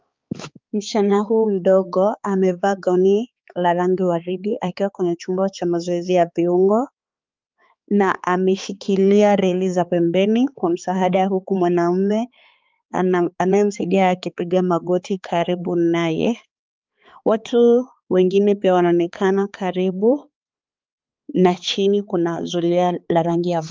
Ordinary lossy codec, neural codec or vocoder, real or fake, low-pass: Opus, 24 kbps; codec, 16 kHz, 4 kbps, X-Codec, HuBERT features, trained on balanced general audio; fake; 7.2 kHz